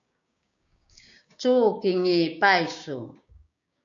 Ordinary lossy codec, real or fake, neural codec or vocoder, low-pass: MP3, 64 kbps; fake; codec, 16 kHz, 6 kbps, DAC; 7.2 kHz